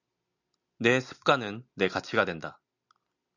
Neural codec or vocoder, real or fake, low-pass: none; real; 7.2 kHz